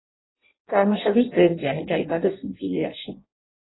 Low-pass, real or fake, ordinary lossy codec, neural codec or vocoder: 7.2 kHz; fake; AAC, 16 kbps; codec, 16 kHz in and 24 kHz out, 0.6 kbps, FireRedTTS-2 codec